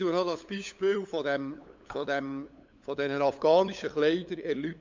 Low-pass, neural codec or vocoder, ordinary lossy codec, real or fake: 7.2 kHz; codec, 16 kHz, 8 kbps, FunCodec, trained on LibriTTS, 25 frames a second; MP3, 64 kbps; fake